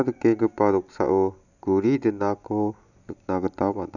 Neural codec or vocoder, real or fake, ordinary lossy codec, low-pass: none; real; none; 7.2 kHz